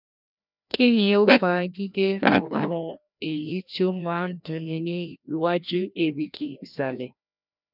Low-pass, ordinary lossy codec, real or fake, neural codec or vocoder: 5.4 kHz; none; fake; codec, 16 kHz, 1 kbps, FreqCodec, larger model